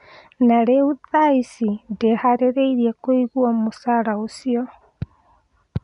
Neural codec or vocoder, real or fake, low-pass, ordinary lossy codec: none; real; 10.8 kHz; none